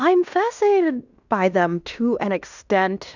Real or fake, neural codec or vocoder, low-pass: fake; codec, 16 kHz in and 24 kHz out, 0.9 kbps, LongCat-Audio-Codec, fine tuned four codebook decoder; 7.2 kHz